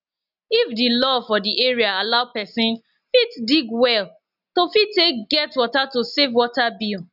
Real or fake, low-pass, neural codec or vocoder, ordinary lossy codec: real; 5.4 kHz; none; none